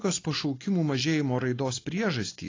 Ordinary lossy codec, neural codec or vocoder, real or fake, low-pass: AAC, 32 kbps; none; real; 7.2 kHz